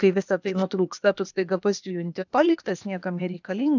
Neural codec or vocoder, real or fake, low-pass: codec, 16 kHz, 0.8 kbps, ZipCodec; fake; 7.2 kHz